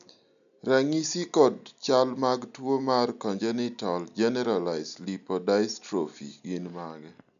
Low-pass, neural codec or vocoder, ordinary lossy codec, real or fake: 7.2 kHz; none; none; real